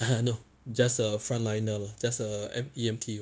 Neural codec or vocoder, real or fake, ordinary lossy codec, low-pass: codec, 16 kHz, 0.9 kbps, LongCat-Audio-Codec; fake; none; none